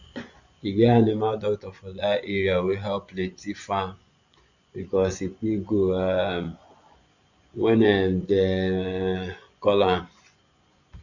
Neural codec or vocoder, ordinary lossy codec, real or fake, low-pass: vocoder, 24 kHz, 100 mel bands, Vocos; none; fake; 7.2 kHz